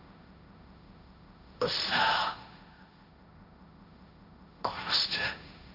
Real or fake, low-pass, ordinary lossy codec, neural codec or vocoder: fake; 5.4 kHz; none; codec, 16 kHz, 1.1 kbps, Voila-Tokenizer